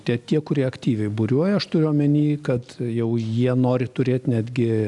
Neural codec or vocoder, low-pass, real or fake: none; 10.8 kHz; real